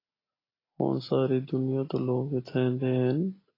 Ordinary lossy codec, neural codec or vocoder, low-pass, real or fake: AAC, 24 kbps; none; 5.4 kHz; real